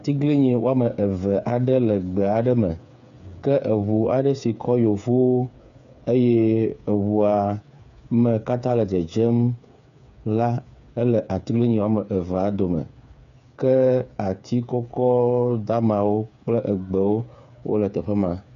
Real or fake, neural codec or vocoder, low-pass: fake; codec, 16 kHz, 8 kbps, FreqCodec, smaller model; 7.2 kHz